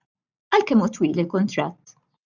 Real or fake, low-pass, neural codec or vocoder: real; 7.2 kHz; none